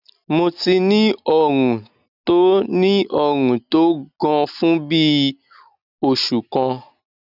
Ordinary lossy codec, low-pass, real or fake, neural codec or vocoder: none; 5.4 kHz; real; none